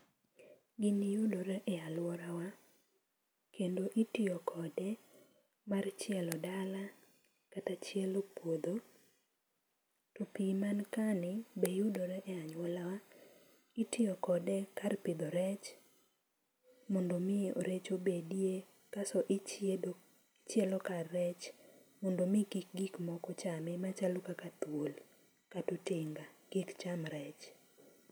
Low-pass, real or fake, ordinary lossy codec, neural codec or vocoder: none; real; none; none